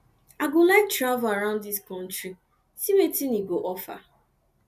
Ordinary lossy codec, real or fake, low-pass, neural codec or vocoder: none; real; 14.4 kHz; none